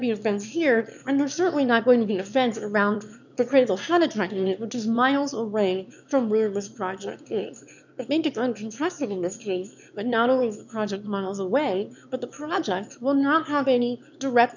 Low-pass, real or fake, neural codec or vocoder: 7.2 kHz; fake; autoencoder, 22.05 kHz, a latent of 192 numbers a frame, VITS, trained on one speaker